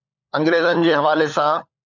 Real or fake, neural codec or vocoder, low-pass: fake; codec, 16 kHz, 16 kbps, FunCodec, trained on LibriTTS, 50 frames a second; 7.2 kHz